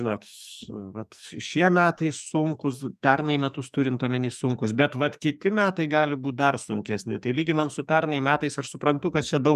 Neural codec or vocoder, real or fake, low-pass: codec, 44.1 kHz, 2.6 kbps, SNAC; fake; 14.4 kHz